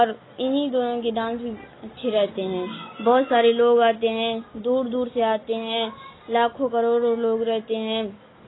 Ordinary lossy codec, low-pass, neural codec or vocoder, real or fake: AAC, 16 kbps; 7.2 kHz; none; real